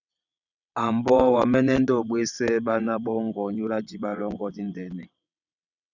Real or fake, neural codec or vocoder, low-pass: fake; vocoder, 22.05 kHz, 80 mel bands, WaveNeXt; 7.2 kHz